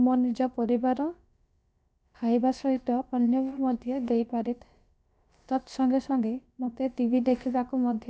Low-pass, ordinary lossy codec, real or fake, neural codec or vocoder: none; none; fake; codec, 16 kHz, about 1 kbps, DyCAST, with the encoder's durations